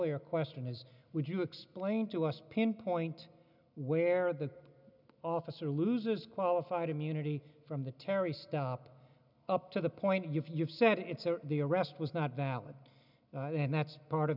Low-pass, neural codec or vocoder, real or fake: 5.4 kHz; none; real